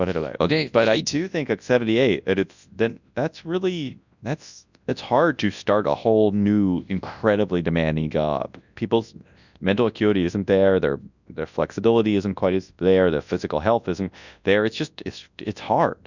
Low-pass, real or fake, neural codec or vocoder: 7.2 kHz; fake; codec, 24 kHz, 0.9 kbps, WavTokenizer, large speech release